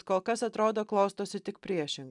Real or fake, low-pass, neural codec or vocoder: real; 10.8 kHz; none